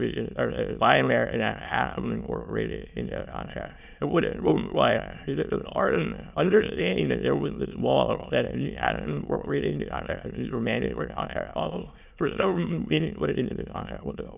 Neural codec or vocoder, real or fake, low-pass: autoencoder, 22.05 kHz, a latent of 192 numbers a frame, VITS, trained on many speakers; fake; 3.6 kHz